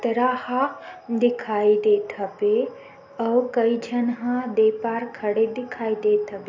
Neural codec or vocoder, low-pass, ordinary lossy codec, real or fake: none; 7.2 kHz; AAC, 48 kbps; real